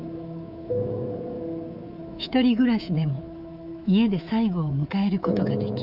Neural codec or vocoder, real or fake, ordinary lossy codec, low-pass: codec, 24 kHz, 3.1 kbps, DualCodec; fake; Opus, 64 kbps; 5.4 kHz